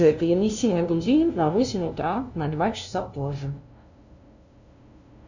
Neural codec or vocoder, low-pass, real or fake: codec, 16 kHz, 0.5 kbps, FunCodec, trained on LibriTTS, 25 frames a second; 7.2 kHz; fake